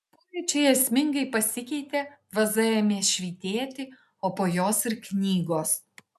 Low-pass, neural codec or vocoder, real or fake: 14.4 kHz; none; real